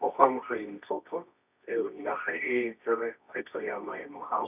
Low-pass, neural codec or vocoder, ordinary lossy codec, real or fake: 3.6 kHz; codec, 24 kHz, 0.9 kbps, WavTokenizer, medium music audio release; none; fake